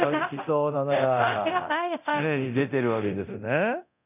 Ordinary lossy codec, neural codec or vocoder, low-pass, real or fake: none; codec, 24 kHz, 0.9 kbps, DualCodec; 3.6 kHz; fake